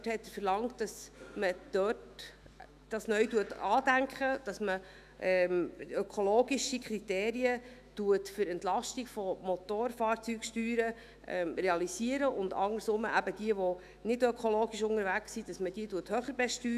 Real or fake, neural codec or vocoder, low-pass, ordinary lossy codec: fake; autoencoder, 48 kHz, 128 numbers a frame, DAC-VAE, trained on Japanese speech; 14.4 kHz; none